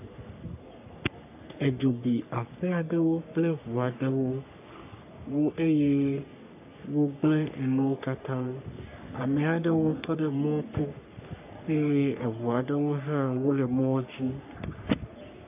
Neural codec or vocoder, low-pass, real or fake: codec, 44.1 kHz, 3.4 kbps, Pupu-Codec; 3.6 kHz; fake